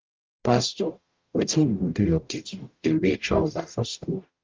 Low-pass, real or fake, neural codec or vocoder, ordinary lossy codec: 7.2 kHz; fake; codec, 44.1 kHz, 0.9 kbps, DAC; Opus, 32 kbps